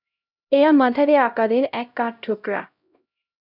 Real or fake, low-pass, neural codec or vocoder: fake; 5.4 kHz; codec, 16 kHz, 0.5 kbps, X-Codec, HuBERT features, trained on LibriSpeech